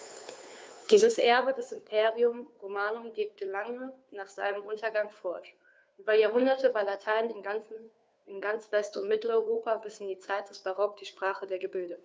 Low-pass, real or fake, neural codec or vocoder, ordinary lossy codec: none; fake; codec, 16 kHz, 2 kbps, FunCodec, trained on Chinese and English, 25 frames a second; none